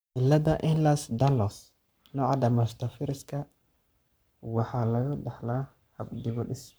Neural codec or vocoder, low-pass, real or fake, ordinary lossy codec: codec, 44.1 kHz, 7.8 kbps, Pupu-Codec; none; fake; none